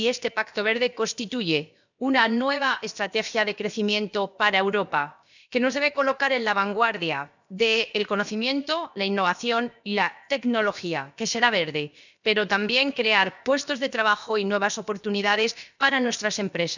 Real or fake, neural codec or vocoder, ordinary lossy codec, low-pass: fake; codec, 16 kHz, about 1 kbps, DyCAST, with the encoder's durations; none; 7.2 kHz